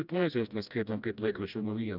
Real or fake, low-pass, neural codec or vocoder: fake; 5.4 kHz; codec, 16 kHz, 1 kbps, FreqCodec, smaller model